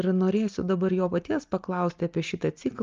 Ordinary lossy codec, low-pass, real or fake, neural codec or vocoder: Opus, 32 kbps; 7.2 kHz; real; none